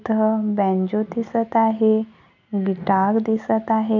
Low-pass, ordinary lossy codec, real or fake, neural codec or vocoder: 7.2 kHz; none; real; none